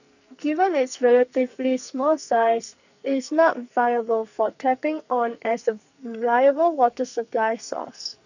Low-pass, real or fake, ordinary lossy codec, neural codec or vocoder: 7.2 kHz; fake; none; codec, 44.1 kHz, 2.6 kbps, SNAC